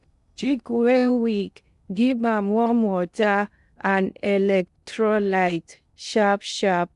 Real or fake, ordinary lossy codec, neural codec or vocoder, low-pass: fake; none; codec, 16 kHz in and 24 kHz out, 0.6 kbps, FocalCodec, streaming, 2048 codes; 10.8 kHz